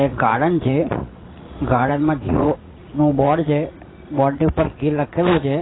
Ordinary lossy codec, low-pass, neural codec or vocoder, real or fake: AAC, 16 kbps; 7.2 kHz; vocoder, 44.1 kHz, 80 mel bands, Vocos; fake